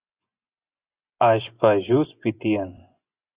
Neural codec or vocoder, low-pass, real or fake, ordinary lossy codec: vocoder, 24 kHz, 100 mel bands, Vocos; 3.6 kHz; fake; Opus, 64 kbps